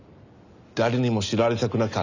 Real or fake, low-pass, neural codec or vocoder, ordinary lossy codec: real; 7.2 kHz; none; none